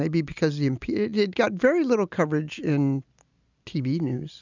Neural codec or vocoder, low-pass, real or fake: none; 7.2 kHz; real